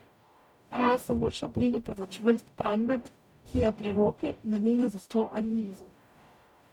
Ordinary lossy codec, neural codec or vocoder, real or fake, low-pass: none; codec, 44.1 kHz, 0.9 kbps, DAC; fake; 19.8 kHz